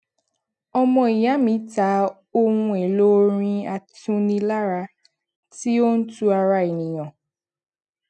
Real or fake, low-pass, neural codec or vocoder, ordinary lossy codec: real; 10.8 kHz; none; none